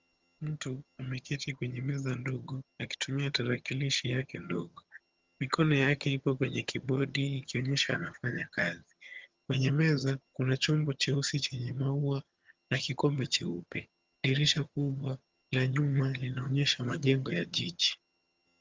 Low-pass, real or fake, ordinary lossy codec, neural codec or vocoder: 7.2 kHz; fake; Opus, 32 kbps; vocoder, 22.05 kHz, 80 mel bands, HiFi-GAN